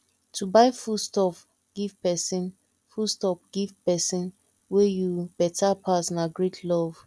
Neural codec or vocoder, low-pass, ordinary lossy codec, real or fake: none; none; none; real